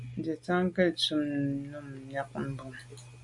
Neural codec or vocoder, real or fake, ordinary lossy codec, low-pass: none; real; MP3, 64 kbps; 10.8 kHz